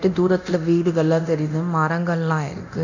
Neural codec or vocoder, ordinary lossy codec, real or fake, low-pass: codec, 24 kHz, 0.9 kbps, DualCodec; none; fake; 7.2 kHz